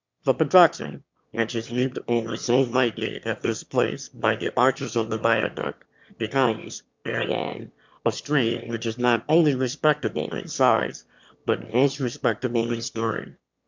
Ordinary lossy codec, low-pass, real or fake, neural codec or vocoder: MP3, 64 kbps; 7.2 kHz; fake; autoencoder, 22.05 kHz, a latent of 192 numbers a frame, VITS, trained on one speaker